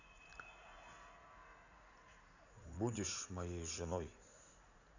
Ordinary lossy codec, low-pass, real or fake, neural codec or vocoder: none; 7.2 kHz; fake; vocoder, 44.1 kHz, 80 mel bands, Vocos